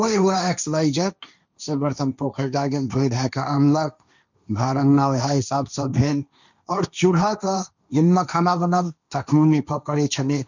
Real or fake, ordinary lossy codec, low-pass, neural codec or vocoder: fake; none; 7.2 kHz; codec, 16 kHz, 1.1 kbps, Voila-Tokenizer